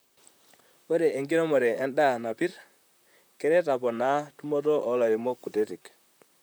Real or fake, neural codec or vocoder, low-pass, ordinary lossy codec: fake; vocoder, 44.1 kHz, 128 mel bands, Pupu-Vocoder; none; none